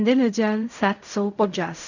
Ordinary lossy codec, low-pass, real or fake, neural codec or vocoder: none; 7.2 kHz; fake; codec, 16 kHz in and 24 kHz out, 0.4 kbps, LongCat-Audio-Codec, fine tuned four codebook decoder